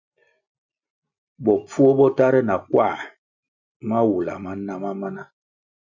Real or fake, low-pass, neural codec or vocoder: real; 7.2 kHz; none